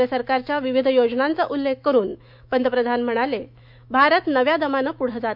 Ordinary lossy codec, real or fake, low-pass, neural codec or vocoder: none; fake; 5.4 kHz; autoencoder, 48 kHz, 128 numbers a frame, DAC-VAE, trained on Japanese speech